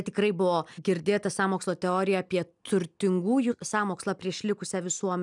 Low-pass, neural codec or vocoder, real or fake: 10.8 kHz; none; real